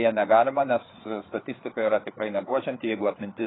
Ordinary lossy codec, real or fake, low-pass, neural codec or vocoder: AAC, 16 kbps; fake; 7.2 kHz; codec, 16 kHz, 4 kbps, FreqCodec, larger model